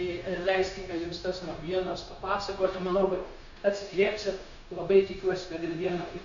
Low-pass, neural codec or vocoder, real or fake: 7.2 kHz; codec, 16 kHz, 0.9 kbps, LongCat-Audio-Codec; fake